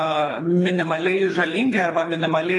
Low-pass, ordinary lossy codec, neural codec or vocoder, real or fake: 10.8 kHz; AAC, 32 kbps; codec, 24 kHz, 3 kbps, HILCodec; fake